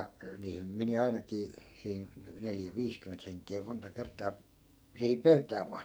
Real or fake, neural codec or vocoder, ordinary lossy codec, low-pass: fake; codec, 44.1 kHz, 2.6 kbps, SNAC; none; none